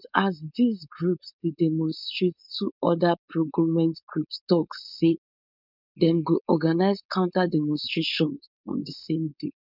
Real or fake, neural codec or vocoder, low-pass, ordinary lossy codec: fake; codec, 16 kHz, 4.8 kbps, FACodec; 5.4 kHz; none